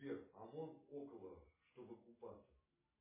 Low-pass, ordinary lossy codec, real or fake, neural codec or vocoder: 3.6 kHz; MP3, 16 kbps; real; none